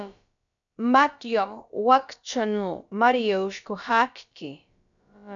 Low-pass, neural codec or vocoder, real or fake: 7.2 kHz; codec, 16 kHz, about 1 kbps, DyCAST, with the encoder's durations; fake